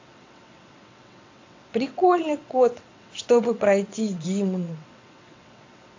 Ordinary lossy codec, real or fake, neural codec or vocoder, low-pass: AAC, 48 kbps; fake; vocoder, 22.05 kHz, 80 mel bands, WaveNeXt; 7.2 kHz